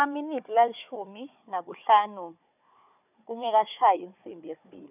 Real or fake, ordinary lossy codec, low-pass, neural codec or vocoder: fake; none; 3.6 kHz; codec, 16 kHz, 4 kbps, FunCodec, trained on Chinese and English, 50 frames a second